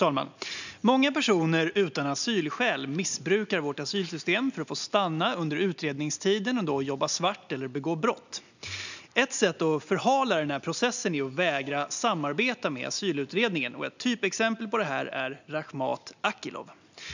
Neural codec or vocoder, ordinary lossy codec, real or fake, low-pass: none; none; real; 7.2 kHz